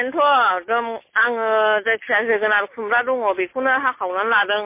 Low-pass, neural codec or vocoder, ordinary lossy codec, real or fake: 3.6 kHz; none; MP3, 24 kbps; real